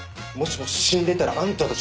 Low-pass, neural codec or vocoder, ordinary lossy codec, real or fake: none; none; none; real